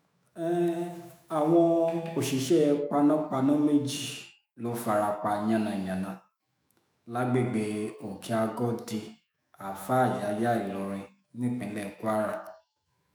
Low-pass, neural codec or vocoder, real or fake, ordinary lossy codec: none; autoencoder, 48 kHz, 128 numbers a frame, DAC-VAE, trained on Japanese speech; fake; none